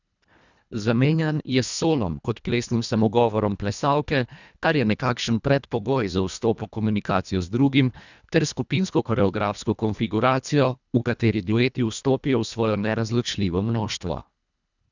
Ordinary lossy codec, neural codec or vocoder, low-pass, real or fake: none; codec, 24 kHz, 1.5 kbps, HILCodec; 7.2 kHz; fake